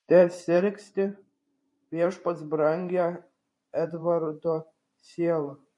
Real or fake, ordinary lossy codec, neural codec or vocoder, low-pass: fake; MP3, 48 kbps; vocoder, 44.1 kHz, 128 mel bands every 512 samples, BigVGAN v2; 10.8 kHz